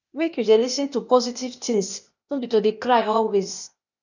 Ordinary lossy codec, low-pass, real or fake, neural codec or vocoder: none; 7.2 kHz; fake; codec, 16 kHz, 0.8 kbps, ZipCodec